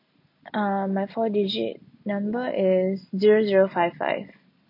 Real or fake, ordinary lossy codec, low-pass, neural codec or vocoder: real; MP3, 48 kbps; 5.4 kHz; none